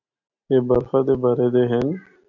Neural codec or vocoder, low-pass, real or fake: none; 7.2 kHz; real